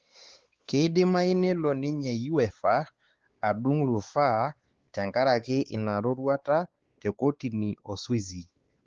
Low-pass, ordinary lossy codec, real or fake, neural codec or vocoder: 7.2 kHz; Opus, 16 kbps; fake; codec, 16 kHz, 4 kbps, X-Codec, WavLM features, trained on Multilingual LibriSpeech